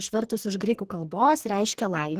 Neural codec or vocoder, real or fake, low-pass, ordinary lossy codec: codec, 44.1 kHz, 2.6 kbps, SNAC; fake; 14.4 kHz; Opus, 16 kbps